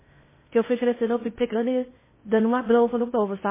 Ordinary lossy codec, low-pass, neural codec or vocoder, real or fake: MP3, 16 kbps; 3.6 kHz; codec, 16 kHz in and 24 kHz out, 0.6 kbps, FocalCodec, streaming, 2048 codes; fake